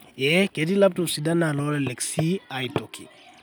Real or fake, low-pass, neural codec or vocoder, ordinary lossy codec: real; none; none; none